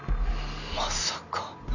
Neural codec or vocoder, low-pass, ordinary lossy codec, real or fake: none; 7.2 kHz; none; real